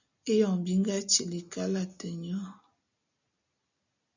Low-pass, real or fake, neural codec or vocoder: 7.2 kHz; real; none